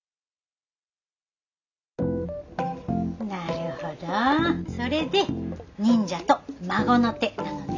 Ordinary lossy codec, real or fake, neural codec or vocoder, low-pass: none; real; none; 7.2 kHz